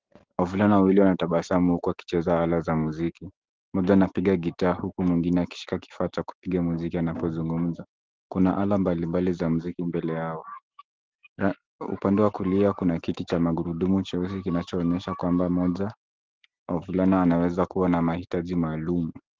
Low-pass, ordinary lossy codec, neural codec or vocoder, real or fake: 7.2 kHz; Opus, 16 kbps; none; real